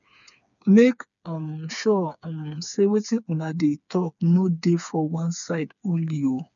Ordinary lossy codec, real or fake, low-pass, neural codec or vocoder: none; fake; 7.2 kHz; codec, 16 kHz, 4 kbps, FreqCodec, smaller model